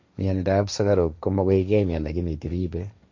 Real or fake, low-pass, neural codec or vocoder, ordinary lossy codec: fake; 7.2 kHz; codec, 16 kHz, 1.1 kbps, Voila-Tokenizer; MP3, 48 kbps